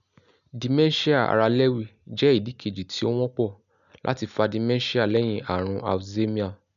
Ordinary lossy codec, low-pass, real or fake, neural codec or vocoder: none; 7.2 kHz; real; none